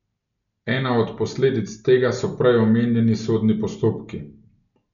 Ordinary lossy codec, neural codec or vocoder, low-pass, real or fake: none; none; 7.2 kHz; real